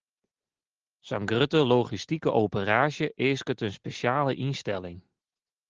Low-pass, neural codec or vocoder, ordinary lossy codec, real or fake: 7.2 kHz; none; Opus, 16 kbps; real